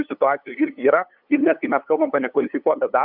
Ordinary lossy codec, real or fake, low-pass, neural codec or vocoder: AAC, 48 kbps; fake; 5.4 kHz; codec, 16 kHz, 8 kbps, FunCodec, trained on LibriTTS, 25 frames a second